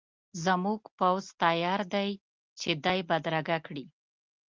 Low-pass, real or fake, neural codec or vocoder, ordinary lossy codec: 7.2 kHz; real; none; Opus, 32 kbps